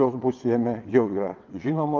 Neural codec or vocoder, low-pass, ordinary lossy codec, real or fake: vocoder, 22.05 kHz, 80 mel bands, WaveNeXt; 7.2 kHz; Opus, 32 kbps; fake